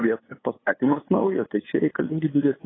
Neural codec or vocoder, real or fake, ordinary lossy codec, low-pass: codec, 16 kHz, 8 kbps, FunCodec, trained on LibriTTS, 25 frames a second; fake; AAC, 16 kbps; 7.2 kHz